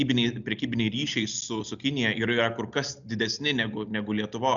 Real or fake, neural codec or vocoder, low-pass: real; none; 7.2 kHz